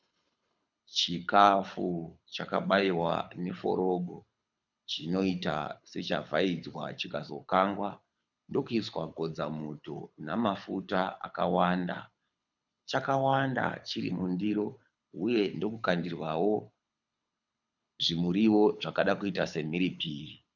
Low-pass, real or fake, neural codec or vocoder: 7.2 kHz; fake; codec, 24 kHz, 6 kbps, HILCodec